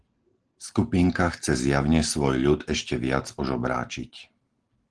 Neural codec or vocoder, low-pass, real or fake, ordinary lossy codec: none; 9.9 kHz; real; Opus, 16 kbps